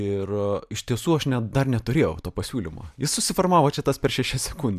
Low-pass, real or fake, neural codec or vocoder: 14.4 kHz; fake; vocoder, 44.1 kHz, 128 mel bands every 512 samples, BigVGAN v2